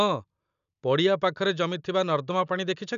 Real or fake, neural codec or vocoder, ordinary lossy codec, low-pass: real; none; none; 7.2 kHz